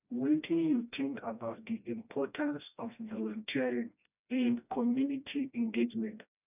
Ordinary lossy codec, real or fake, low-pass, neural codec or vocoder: none; fake; 3.6 kHz; codec, 16 kHz, 1 kbps, FreqCodec, smaller model